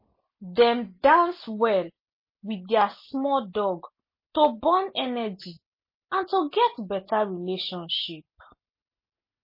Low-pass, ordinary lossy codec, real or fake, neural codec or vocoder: 5.4 kHz; MP3, 24 kbps; real; none